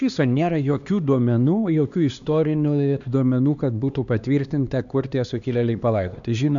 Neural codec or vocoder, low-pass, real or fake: codec, 16 kHz, 2 kbps, X-Codec, HuBERT features, trained on LibriSpeech; 7.2 kHz; fake